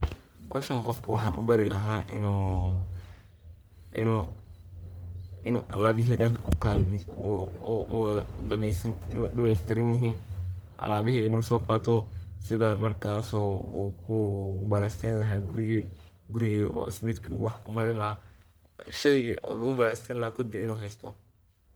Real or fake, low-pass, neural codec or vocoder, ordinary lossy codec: fake; none; codec, 44.1 kHz, 1.7 kbps, Pupu-Codec; none